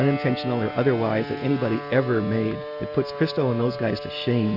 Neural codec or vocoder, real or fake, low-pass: none; real; 5.4 kHz